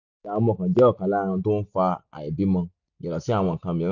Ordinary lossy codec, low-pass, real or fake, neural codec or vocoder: none; 7.2 kHz; real; none